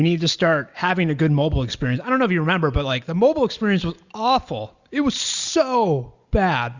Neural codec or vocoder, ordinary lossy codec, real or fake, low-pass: none; Opus, 64 kbps; real; 7.2 kHz